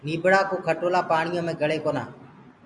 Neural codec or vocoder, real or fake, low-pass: none; real; 10.8 kHz